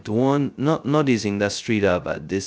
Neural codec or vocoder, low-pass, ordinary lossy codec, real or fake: codec, 16 kHz, 0.2 kbps, FocalCodec; none; none; fake